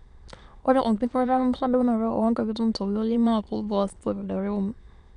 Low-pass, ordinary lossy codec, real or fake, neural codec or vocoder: 9.9 kHz; none; fake; autoencoder, 22.05 kHz, a latent of 192 numbers a frame, VITS, trained on many speakers